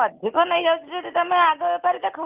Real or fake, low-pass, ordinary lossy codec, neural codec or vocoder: real; 3.6 kHz; Opus, 32 kbps; none